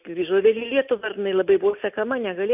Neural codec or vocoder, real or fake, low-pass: none; real; 3.6 kHz